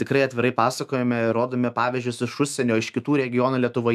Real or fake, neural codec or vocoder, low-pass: fake; autoencoder, 48 kHz, 128 numbers a frame, DAC-VAE, trained on Japanese speech; 14.4 kHz